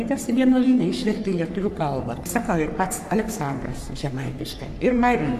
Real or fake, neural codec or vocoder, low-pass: fake; codec, 44.1 kHz, 3.4 kbps, Pupu-Codec; 14.4 kHz